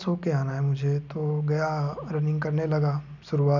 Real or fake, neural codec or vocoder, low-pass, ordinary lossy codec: real; none; 7.2 kHz; none